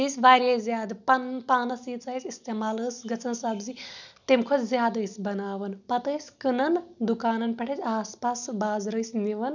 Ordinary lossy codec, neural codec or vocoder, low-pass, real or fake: none; none; 7.2 kHz; real